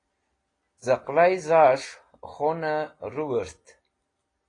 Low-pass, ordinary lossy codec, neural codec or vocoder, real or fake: 9.9 kHz; AAC, 32 kbps; none; real